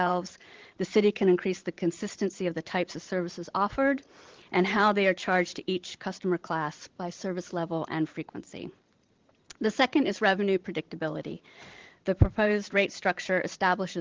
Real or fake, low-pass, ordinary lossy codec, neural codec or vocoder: fake; 7.2 kHz; Opus, 16 kbps; vocoder, 44.1 kHz, 128 mel bands every 512 samples, BigVGAN v2